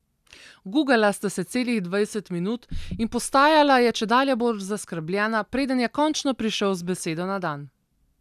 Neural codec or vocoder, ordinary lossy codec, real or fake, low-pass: none; none; real; 14.4 kHz